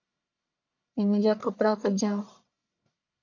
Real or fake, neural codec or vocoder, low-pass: fake; codec, 44.1 kHz, 1.7 kbps, Pupu-Codec; 7.2 kHz